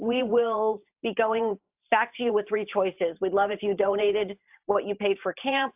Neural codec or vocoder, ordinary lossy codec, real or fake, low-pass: vocoder, 44.1 kHz, 128 mel bands every 512 samples, BigVGAN v2; AAC, 32 kbps; fake; 3.6 kHz